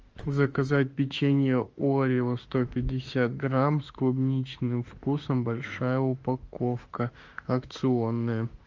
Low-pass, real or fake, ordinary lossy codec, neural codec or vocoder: 7.2 kHz; fake; Opus, 24 kbps; autoencoder, 48 kHz, 32 numbers a frame, DAC-VAE, trained on Japanese speech